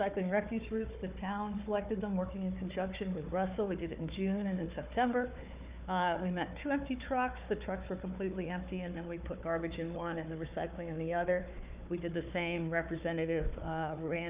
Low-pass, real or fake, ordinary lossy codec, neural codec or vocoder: 3.6 kHz; fake; Opus, 64 kbps; codec, 16 kHz, 4 kbps, FunCodec, trained on Chinese and English, 50 frames a second